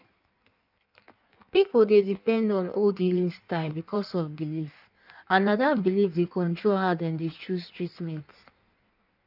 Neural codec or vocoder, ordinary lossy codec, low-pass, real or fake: codec, 16 kHz in and 24 kHz out, 1.1 kbps, FireRedTTS-2 codec; none; 5.4 kHz; fake